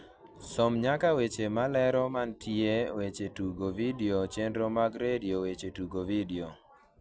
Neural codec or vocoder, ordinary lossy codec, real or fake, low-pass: none; none; real; none